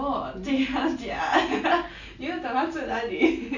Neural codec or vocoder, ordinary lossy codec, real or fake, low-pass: none; none; real; 7.2 kHz